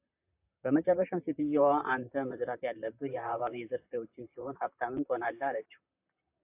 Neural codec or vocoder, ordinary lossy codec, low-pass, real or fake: vocoder, 44.1 kHz, 128 mel bands, Pupu-Vocoder; AAC, 32 kbps; 3.6 kHz; fake